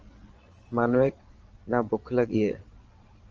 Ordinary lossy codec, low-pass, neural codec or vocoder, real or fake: Opus, 24 kbps; 7.2 kHz; vocoder, 22.05 kHz, 80 mel bands, Vocos; fake